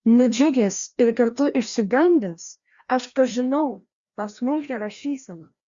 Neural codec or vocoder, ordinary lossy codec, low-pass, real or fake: codec, 16 kHz, 1 kbps, FreqCodec, larger model; Opus, 64 kbps; 7.2 kHz; fake